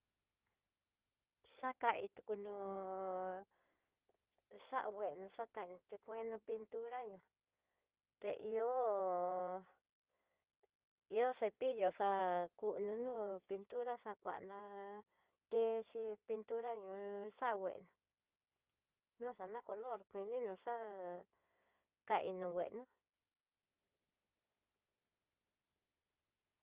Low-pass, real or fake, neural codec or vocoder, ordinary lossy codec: 3.6 kHz; fake; codec, 16 kHz in and 24 kHz out, 2.2 kbps, FireRedTTS-2 codec; Opus, 32 kbps